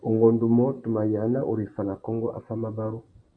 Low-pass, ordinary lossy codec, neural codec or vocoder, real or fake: 9.9 kHz; MP3, 64 kbps; vocoder, 44.1 kHz, 128 mel bands every 512 samples, BigVGAN v2; fake